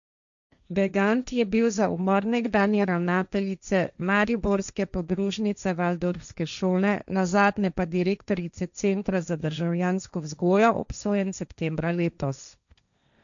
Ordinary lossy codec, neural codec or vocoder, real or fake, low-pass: none; codec, 16 kHz, 1.1 kbps, Voila-Tokenizer; fake; 7.2 kHz